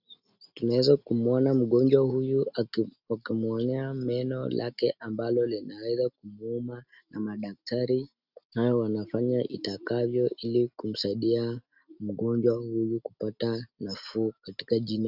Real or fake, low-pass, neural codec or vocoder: real; 5.4 kHz; none